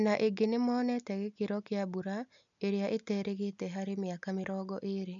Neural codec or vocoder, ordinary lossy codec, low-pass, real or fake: none; none; 7.2 kHz; real